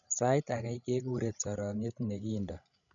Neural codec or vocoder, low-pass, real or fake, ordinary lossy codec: codec, 16 kHz, 16 kbps, FreqCodec, larger model; 7.2 kHz; fake; none